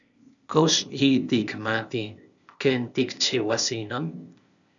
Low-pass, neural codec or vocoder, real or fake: 7.2 kHz; codec, 16 kHz, 0.8 kbps, ZipCodec; fake